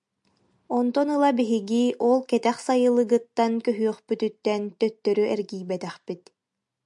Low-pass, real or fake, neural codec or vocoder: 10.8 kHz; real; none